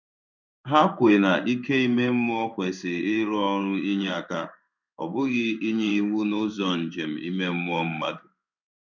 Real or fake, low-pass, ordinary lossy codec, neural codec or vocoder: fake; 7.2 kHz; none; codec, 16 kHz in and 24 kHz out, 1 kbps, XY-Tokenizer